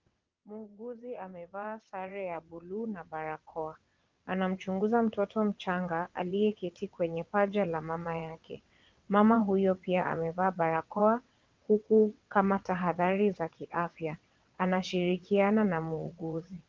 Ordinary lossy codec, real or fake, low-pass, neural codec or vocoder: Opus, 32 kbps; fake; 7.2 kHz; vocoder, 22.05 kHz, 80 mel bands, WaveNeXt